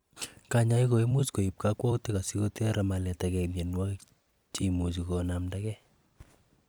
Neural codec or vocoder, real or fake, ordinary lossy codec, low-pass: vocoder, 44.1 kHz, 128 mel bands every 256 samples, BigVGAN v2; fake; none; none